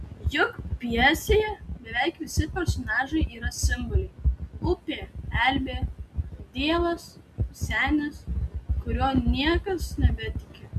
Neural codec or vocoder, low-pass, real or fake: none; 14.4 kHz; real